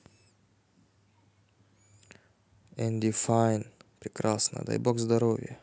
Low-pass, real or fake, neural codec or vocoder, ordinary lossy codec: none; real; none; none